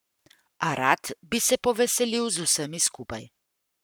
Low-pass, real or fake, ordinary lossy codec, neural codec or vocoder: none; fake; none; codec, 44.1 kHz, 7.8 kbps, Pupu-Codec